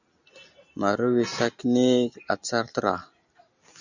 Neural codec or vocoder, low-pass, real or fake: none; 7.2 kHz; real